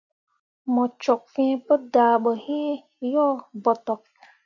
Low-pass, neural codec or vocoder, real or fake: 7.2 kHz; none; real